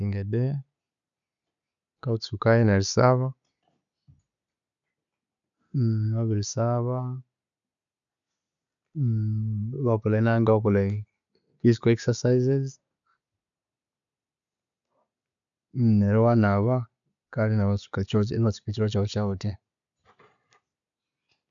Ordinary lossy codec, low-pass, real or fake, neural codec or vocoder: none; 7.2 kHz; real; none